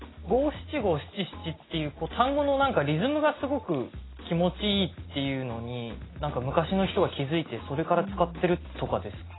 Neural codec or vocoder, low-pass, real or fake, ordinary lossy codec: none; 7.2 kHz; real; AAC, 16 kbps